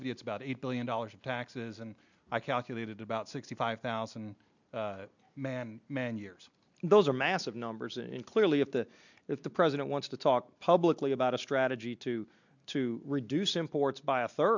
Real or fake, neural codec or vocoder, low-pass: real; none; 7.2 kHz